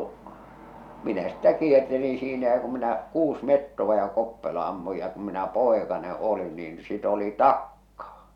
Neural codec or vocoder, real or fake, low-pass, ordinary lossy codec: none; real; 19.8 kHz; none